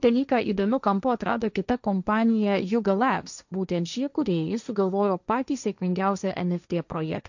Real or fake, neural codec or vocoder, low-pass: fake; codec, 16 kHz, 1.1 kbps, Voila-Tokenizer; 7.2 kHz